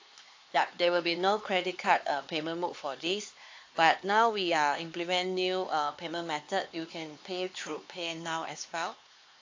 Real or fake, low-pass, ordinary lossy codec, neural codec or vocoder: fake; 7.2 kHz; AAC, 48 kbps; codec, 16 kHz, 4 kbps, X-Codec, HuBERT features, trained on LibriSpeech